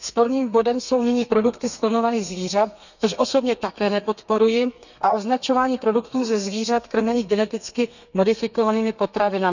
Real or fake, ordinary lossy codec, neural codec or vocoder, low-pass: fake; none; codec, 32 kHz, 1.9 kbps, SNAC; 7.2 kHz